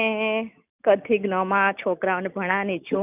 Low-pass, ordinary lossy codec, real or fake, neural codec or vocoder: 3.6 kHz; none; real; none